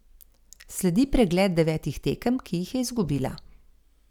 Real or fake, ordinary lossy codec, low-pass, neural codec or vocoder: real; none; 19.8 kHz; none